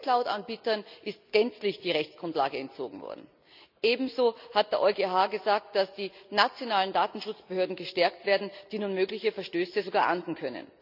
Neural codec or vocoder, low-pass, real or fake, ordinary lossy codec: none; 5.4 kHz; real; none